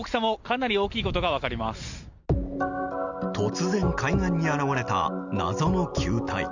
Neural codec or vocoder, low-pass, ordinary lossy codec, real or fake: none; 7.2 kHz; Opus, 64 kbps; real